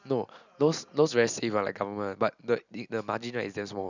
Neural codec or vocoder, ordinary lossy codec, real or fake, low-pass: none; none; real; 7.2 kHz